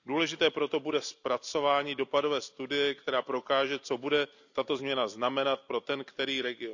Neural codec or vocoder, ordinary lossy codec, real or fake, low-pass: none; none; real; 7.2 kHz